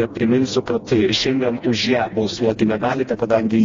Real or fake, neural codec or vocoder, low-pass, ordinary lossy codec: fake; codec, 16 kHz, 1 kbps, FreqCodec, smaller model; 7.2 kHz; AAC, 32 kbps